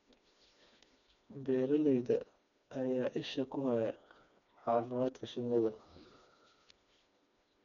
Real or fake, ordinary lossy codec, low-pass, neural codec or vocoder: fake; none; 7.2 kHz; codec, 16 kHz, 2 kbps, FreqCodec, smaller model